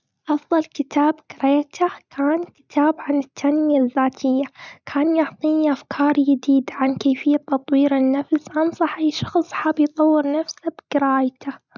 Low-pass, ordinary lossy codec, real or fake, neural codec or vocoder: 7.2 kHz; none; real; none